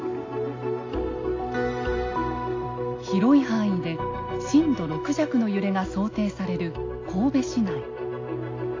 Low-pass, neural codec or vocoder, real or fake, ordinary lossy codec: 7.2 kHz; none; real; AAC, 32 kbps